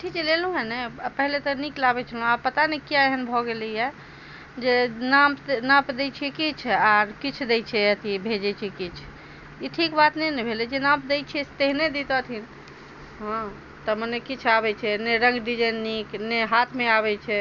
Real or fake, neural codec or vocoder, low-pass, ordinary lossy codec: real; none; 7.2 kHz; none